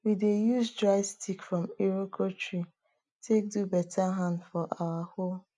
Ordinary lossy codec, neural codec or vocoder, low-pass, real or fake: none; none; 10.8 kHz; real